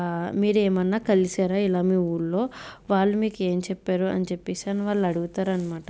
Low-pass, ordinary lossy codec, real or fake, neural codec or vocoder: none; none; real; none